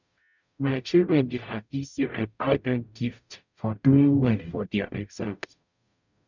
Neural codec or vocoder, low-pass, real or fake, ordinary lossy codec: codec, 44.1 kHz, 0.9 kbps, DAC; 7.2 kHz; fake; none